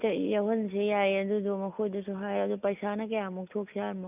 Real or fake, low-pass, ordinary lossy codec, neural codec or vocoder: real; 3.6 kHz; none; none